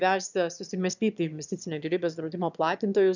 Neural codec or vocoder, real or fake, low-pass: autoencoder, 22.05 kHz, a latent of 192 numbers a frame, VITS, trained on one speaker; fake; 7.2 kHz